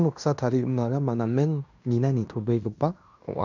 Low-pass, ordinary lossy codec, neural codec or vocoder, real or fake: 7.2 kHz; none; codec, 16 kHz in and 24 kHz out, 0.9 kbps, LongCat-Audio-Codec, fine tuned four codebook decoder; fake